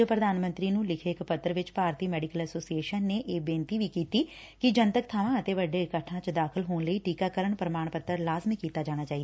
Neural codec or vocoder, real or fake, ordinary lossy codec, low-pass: none; real; none; none